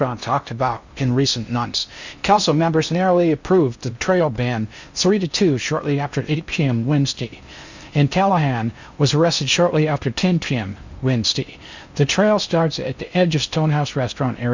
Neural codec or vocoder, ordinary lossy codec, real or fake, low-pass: codec, 16 kHz in and 24 kHz out, 0.6 kbps, FocalCodec, streaming, 2048 codes; Opus, 64 kbps; fake; 7.2 kHz